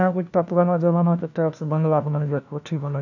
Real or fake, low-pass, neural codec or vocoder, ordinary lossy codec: fake; 7.2 kHz; codec, 16 kHz, 1 kbps, FunCodec, trained on LibriTTS, 50 frames a second; none